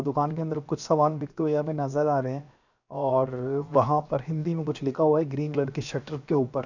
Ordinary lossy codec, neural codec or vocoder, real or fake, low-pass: none; codec, 16 kHz, 0.7 kbps, FocalCodec; fake; 7.2 kHz